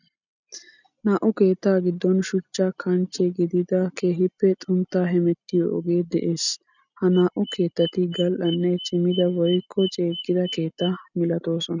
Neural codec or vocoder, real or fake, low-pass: none; real; 7.2 kHz